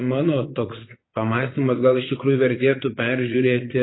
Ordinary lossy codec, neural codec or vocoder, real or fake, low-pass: AAC, 16 kbps; vocoder, 22.05 kHz, 80 mel bands, Vocos; fake; 7.2 kHz